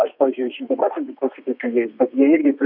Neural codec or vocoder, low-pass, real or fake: codec, 32 kHz, 1.9 kbps, SNAC; 5.4 kHz; fake